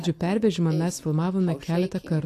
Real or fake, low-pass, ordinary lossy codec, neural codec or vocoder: real; 14.4 kHz; AAC, 64 kbps; none